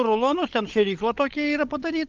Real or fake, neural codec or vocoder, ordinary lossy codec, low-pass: fake; codec, 16 kHz, 16 kbps, FunCodec, trained on LibriTTS, 50 frames a second; Opus, 32 kbps; 7.2 kHz